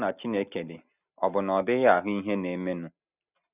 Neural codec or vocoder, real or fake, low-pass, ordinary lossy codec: none; real; 3.6 kHz; none